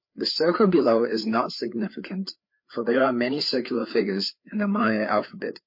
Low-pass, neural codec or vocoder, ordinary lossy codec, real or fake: 5.4 kHz; codec, 16 kHz, 4 kbps, FreqCodec, larger model; MP3, 32 kbps; fake